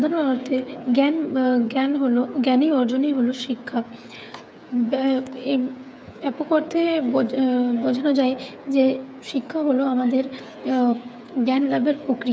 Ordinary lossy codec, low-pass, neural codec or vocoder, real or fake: none; none; codec, 16 kHz, 8 kbps, FreqCodec, smaller model; fake